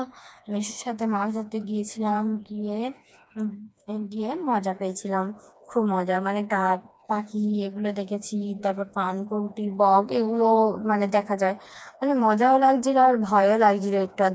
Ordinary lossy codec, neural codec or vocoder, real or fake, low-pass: none; codec, 16 kHz, 2 kbps, FreqCodec, smaller model; fake; none